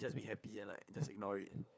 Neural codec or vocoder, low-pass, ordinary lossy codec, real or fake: codec, 16 kHz, 4 kbps, FunCodec, trained on LibriTTS, 50 frames a second; none; none; fake